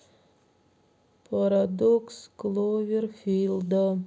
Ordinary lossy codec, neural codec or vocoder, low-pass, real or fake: none; none; none; real